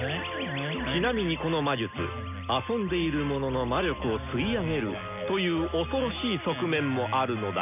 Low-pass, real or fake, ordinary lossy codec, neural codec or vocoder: 3.6 kHz; real; none; none